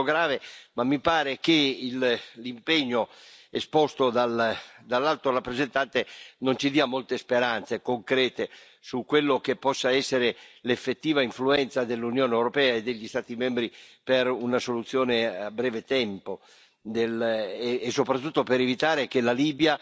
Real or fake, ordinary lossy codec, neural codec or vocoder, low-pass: real; none; none; none